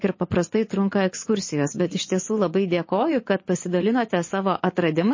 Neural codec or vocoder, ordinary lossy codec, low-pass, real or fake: vocoder, 24 kHz, 100 mel bands, Vocos; MP3, 32 kbps; 7.2 kHz; fake